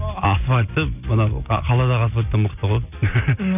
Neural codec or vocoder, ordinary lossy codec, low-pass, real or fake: none; none; 3.6 kHz; real